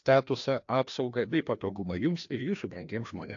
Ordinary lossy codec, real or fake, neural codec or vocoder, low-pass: AAC, 48 kbps; fake; codec, 16 kHz, 1 kbps, FreqCodec, larger model; 7.2 kHz